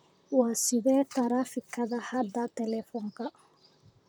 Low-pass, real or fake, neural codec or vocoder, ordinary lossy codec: none; fake; vocoder, 44.1 kHz, 128 mel bands, Pupu-Vocoder; none